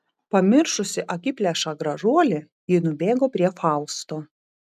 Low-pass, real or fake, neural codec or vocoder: 14.4 kHz; real; none